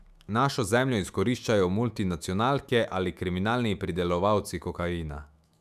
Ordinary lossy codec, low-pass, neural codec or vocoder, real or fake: none; 14.4 kHz; autoencoder, 48 kHz, 128 numbers a frame, DAC-VAE, trained on Japanese speech; fake